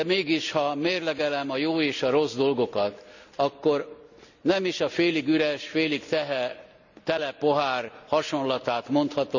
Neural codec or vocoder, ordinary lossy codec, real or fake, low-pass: none; none; real; 7.2 kHz